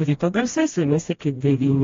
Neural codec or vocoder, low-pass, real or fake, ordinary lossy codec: codec, 16 kHz, 1 kbps, FreqCodec, smaller model; 7.2 kHz; fake; AAC, 32 kbps